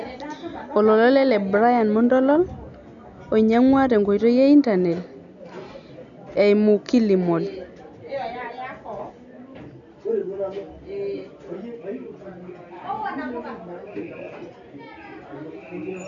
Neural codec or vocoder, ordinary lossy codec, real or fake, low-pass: none; none; real; 7.2 kHz